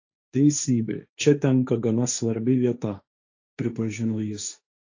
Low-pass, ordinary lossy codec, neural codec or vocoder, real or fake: 7.2 kHz; AAC, 48 kbps; codec, 16 kHz, 1.1 kbps, Voila-Tokenizer; fake